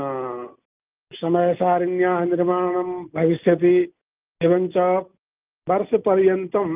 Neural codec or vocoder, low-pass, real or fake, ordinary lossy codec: none; 3.6 kHz; real; Opus, 24 kbps